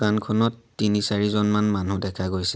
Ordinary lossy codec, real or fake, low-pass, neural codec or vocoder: none; real; none; none